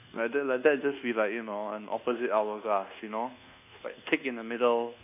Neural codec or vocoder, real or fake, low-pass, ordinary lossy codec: codec, 24 kHz, 1.2 kbps, DualCodec; fake; 3.6 kHz; none